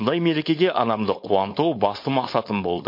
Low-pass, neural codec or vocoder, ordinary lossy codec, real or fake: 5.4 kHz; codec, 16 kHz, 4.8 kbps, FACodec; MP3, 32 kbps; fake